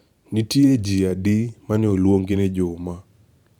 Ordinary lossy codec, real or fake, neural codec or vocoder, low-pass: none; fake; vocoder, 44.1 kHz, 128 mel bands every 512 samples, BigVGAN v2; 19.8 kHz